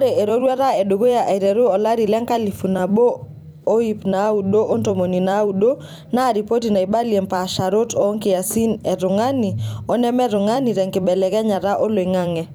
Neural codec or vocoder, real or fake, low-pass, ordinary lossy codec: vocoder, 44.1 kHz, 128 mel bands every 256 samples, BigVGAN v2; fake; none; none